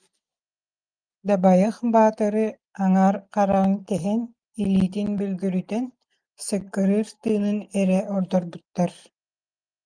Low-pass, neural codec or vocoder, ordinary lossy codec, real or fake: 9.9 kHz; none; Opus, 32 kbps; real